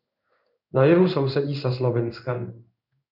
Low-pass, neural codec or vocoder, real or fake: 5.4 kHz; codec, 16 kHz in and 24 kHz out, 1 kbps, XY-Tokenizer; fake